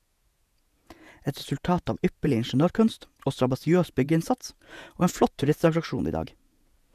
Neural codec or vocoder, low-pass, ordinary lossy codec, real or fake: vocoder, 48 kHz, 128 mel bands, Vocos; 14.4 kHz; none; fake